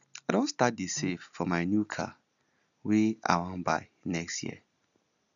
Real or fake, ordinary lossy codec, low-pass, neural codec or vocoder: real; none; 7.2 kHz; none